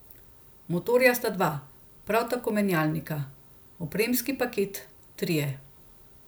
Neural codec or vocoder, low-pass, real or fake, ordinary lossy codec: none; none; real; none